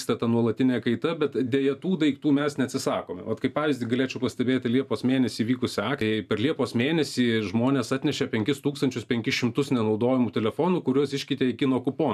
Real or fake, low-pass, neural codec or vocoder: fake; 14.4 kHz; vocoder, 44.1 kHz, 128 mel bands every 512 samples, BigVGAN v2